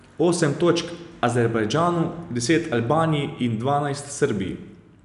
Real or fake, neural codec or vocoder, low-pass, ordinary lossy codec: real; none; 10.8 kHz; none